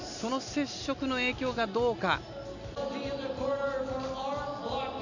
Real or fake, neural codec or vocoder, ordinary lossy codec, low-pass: real; none; none; 7.2 kHz